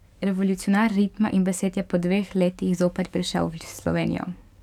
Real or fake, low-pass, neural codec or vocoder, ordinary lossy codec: fake; 19.8 kHz; codec, 44.1 kHz, 7.8 kbps, DAC; none